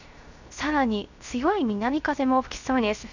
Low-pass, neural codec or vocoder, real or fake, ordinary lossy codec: 7.2 kHz; codec, 16 kHz, 0.3 kbps, FocalCodec; fake; none